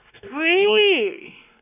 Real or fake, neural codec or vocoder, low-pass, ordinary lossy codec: fake; codec, 16 kHz, 2 kbps, X-Codec, WavLM features, trained on Multilingual LibriSpeech; 3.6 kHz; none